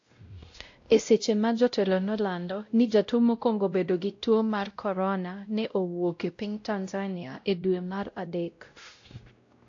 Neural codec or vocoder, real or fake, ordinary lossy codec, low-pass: codec, 16 kHz, 0.5 kbps, X-Codec, WavLM features, trained on Multilingual LibriSpeech; fake; AAC, 48 kbps; 7.2 kHz